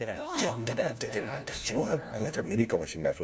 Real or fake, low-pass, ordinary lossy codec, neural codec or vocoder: fake; none; none; codec, 16 kHz, 1 kbps, FunCodec, trained on LibriTTS, 50 frames a second